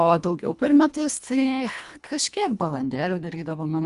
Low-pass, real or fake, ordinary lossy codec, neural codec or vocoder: 10.8 kHz; fake; Opus, 64 kbps; codec, 24 kHz, 1.5 kbps, HILCodec